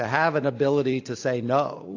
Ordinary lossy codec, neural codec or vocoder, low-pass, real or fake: AAC, 48 kbps; none; 7.2 kHz; real